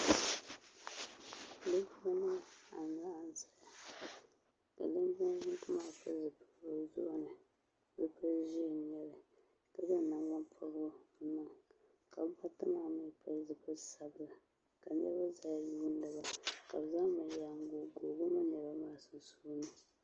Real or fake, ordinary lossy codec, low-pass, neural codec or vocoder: real; Opus, 24 kbps; 7.2 kHz; none